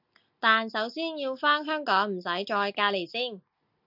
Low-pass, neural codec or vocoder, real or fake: 5.4 kHz; none; real